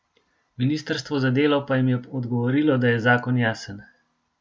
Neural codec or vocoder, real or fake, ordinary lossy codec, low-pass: none; real; none; none